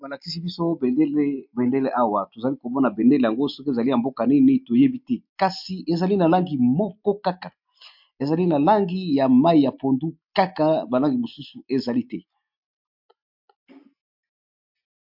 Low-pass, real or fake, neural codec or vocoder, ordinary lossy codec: 5.4 kHz; real; none; MP3, 48 kbps